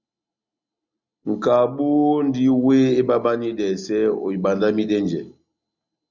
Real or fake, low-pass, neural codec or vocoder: real; 7.2 kHz; none